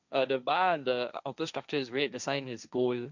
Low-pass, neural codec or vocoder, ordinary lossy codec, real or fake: 7.2 kHz; codec, 16 kHz, 1.1 kbps, Voila-Tokenizer; none; fake